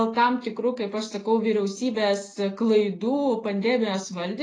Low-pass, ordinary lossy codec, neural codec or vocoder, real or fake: 9.9 kHz; AAC, 32 kbps; none; real